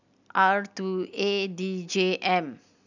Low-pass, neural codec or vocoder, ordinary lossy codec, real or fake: 7.2 kHz; none; none; real